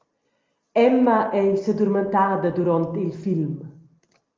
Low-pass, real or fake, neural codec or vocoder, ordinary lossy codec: 7.2 kHz; real; none; Opus, 32 kbps